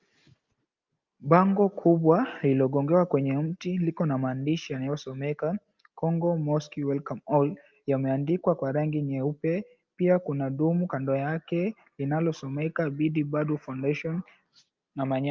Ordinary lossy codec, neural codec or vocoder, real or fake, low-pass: Opus, 24 kbps; none; real; 7.2 kHz